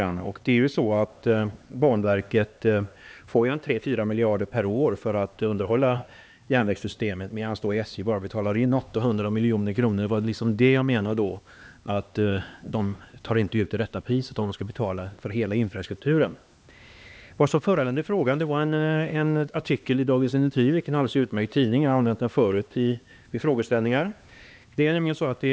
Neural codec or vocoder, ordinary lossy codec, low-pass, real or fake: codec, 16 kHz, 2 kbps, X-Codec, HuBERT features, trained on LibriSpeech; none; none; fake